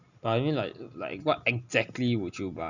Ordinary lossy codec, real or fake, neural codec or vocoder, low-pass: none; real; none; 7.2 kHz